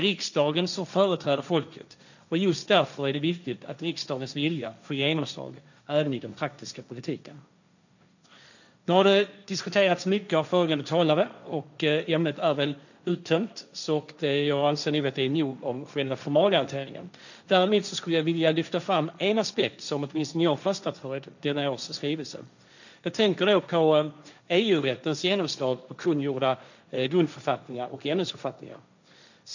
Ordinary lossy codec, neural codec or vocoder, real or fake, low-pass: none; codec, 16 kHz, 1.1 kbps, Voila-Tokenizer; fake; 7.2 kHz